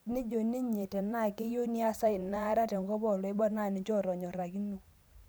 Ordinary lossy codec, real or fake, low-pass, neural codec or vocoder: none; fake; none; vocoder, 44.1 kHz, 128 mel bands every 512 samples, BigVGAN v2